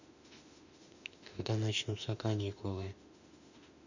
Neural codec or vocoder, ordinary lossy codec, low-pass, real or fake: autoencoder, 48 kHz, 32 numbers a frame, DAC-VAE, trained on Japanese speech; none; 7.2 kHz; fake